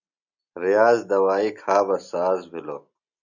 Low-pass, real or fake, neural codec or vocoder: 7.2 kHz; real; none